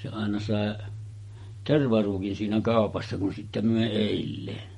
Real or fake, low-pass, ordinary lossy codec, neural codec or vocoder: fake; 19.8 kHz; MP3, 48 kbps; vocoder, 48 kHz, 128 mel bands, Vocos